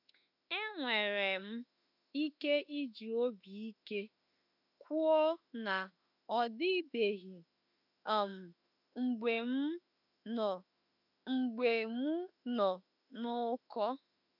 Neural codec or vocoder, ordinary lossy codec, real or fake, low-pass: autoencoder, 48 kHz, 32 numbers a frame, DAC-VAE, trained on Japanese speech; none; fake; 5.4 kHz